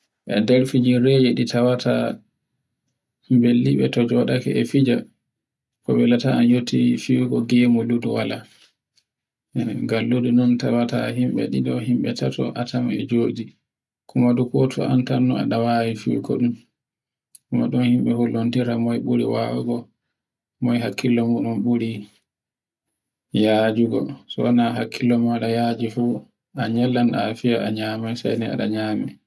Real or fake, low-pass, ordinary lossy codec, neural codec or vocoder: real; none; none; none